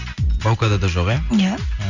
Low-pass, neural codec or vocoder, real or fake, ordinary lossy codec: 7.2 kHz; none; real; Opus, 64 kbps